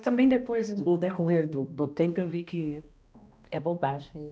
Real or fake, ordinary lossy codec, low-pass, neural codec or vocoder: fake; none; none; codec, 16 kHz, 1 kbps, X-Codec, HuBERT features, trained on balanced general audio